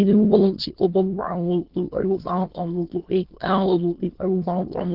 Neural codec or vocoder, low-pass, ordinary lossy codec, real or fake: autoencoder, 22.05 kHz, a latent of 192 numbers a frame, VITS, trained on many speakers; 5.4 kHz; Opus, 16 kbps; fake